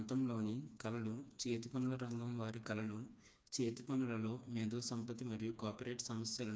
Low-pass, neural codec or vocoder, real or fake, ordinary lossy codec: none; codec, 16 kHz, 2 kbps, FreqCodec, smaller model; fake; none